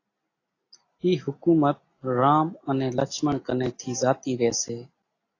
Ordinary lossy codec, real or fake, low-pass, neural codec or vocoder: AAC, 32 kbps; real; 7.2 kHz; none